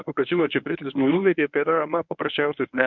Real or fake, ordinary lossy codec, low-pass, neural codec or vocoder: fake; MP3, 48 kbps; 7.2 kHz; codec, 24 kHz, 0.9 kbps, WavTokenizer, medium speech release version 1